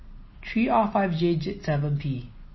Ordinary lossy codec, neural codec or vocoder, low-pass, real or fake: MP3, 24 kbps; none; 7.2 kHz; real